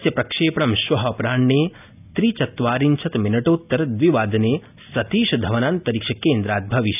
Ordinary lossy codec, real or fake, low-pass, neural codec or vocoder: none; real; 3.6 kHz; none